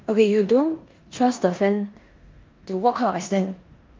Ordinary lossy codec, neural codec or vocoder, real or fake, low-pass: Opus, 32 kbps; codec, 16 kHz in and 24 kHz out, 0.9 kbps, LongCat-Audio-Codec, four codebook decoder; fake; 7.2 kHz